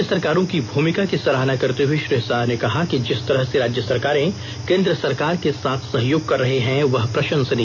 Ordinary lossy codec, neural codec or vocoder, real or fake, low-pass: none; none; real; 7.2 kHz